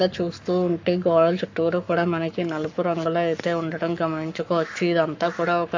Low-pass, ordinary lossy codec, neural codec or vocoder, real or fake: 7.2 kHz; AAC, 48 kbps; codec, 44.1 kHz, 7.8 kbps, Pupu-Codec; fake